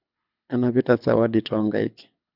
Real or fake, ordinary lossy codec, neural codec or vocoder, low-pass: fake; none; codec, 24 kHz, 6 kbps, HILCodec; 5.4 kHz